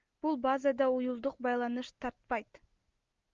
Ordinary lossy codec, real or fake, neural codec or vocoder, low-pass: Opus, 32 kbps; real; none; 7.2 kHz